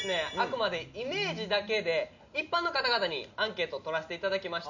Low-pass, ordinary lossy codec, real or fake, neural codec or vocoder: 7.2 kHz; none; real; none